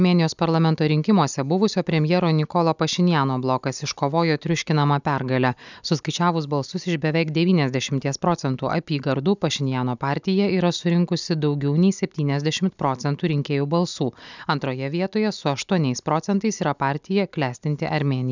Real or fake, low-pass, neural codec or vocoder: real; 7.2 kHz; none